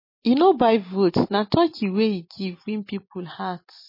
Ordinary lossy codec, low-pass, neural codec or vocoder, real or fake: MP3, 24 kbps; 5.4 kHz; none; real